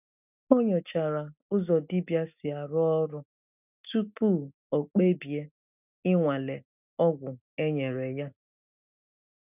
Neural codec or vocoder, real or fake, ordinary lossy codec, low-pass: none; real; none; 3.6 kHz